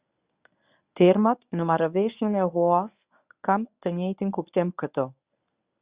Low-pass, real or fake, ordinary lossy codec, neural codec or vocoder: 3.6 kHz; fake; Opus, 64 kbps; codec, 24 kHz, 0.9 kbps, WavTokenizer, medium speech release version 1